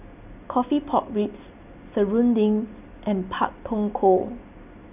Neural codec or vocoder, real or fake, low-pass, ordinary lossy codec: none; real; 3.6 kHz; none